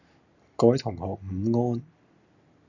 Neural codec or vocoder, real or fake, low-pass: none; real; 7.2 kHz